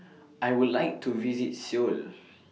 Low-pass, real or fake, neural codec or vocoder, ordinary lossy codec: none; real; none; none